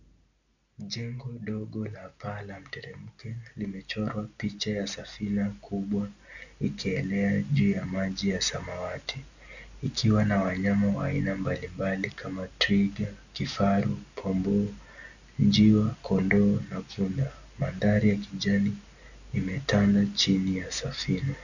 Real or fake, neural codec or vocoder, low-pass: real; none; 7.2 kHz